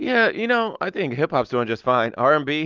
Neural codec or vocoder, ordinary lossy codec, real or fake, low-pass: none; Opus, 24 kbps; real; 7.2 kHz